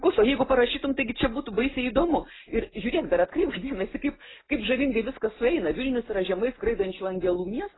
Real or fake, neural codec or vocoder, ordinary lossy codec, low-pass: real; none; AAC, 16 kbps; 7.2 kHz